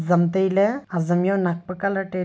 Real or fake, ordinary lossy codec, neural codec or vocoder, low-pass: real; none; none; none